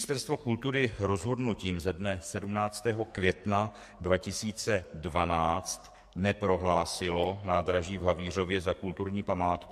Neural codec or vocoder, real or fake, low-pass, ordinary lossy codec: codec, 44.1 kHz, 2.6 kbps, SNAC; fake; 14.4 kHz; MP3, 64 kbps